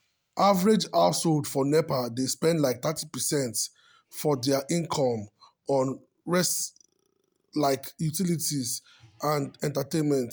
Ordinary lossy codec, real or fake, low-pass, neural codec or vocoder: none; real; none; none